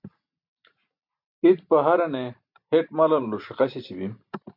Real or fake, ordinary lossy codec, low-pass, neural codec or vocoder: real; MP3, 48 kbps; 5.4 kHz; none